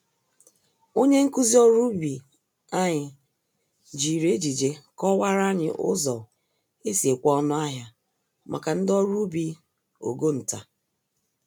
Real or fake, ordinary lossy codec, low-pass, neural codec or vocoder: real; none; none; none